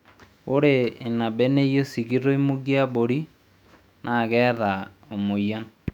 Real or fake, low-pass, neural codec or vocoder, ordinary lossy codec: fake; 19.8 kHz; autoencoder, 48 kHz, 128 numbers a frame, DAC-VAE, trained on Japanese speech; none